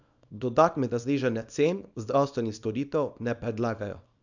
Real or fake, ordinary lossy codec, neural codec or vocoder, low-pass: fake; none; codec, 24 kHz, 0.9 kbps, WavTokenizer, small release; 7.2 kHz